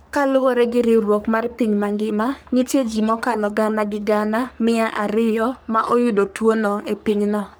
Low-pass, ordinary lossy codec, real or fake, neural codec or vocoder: none; none; fake; codec, 44.1 kHz, 3.4 kbps, Pupu-Codec